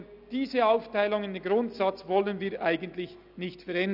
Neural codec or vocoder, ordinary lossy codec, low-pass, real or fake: none; none; 5.4 kHz; real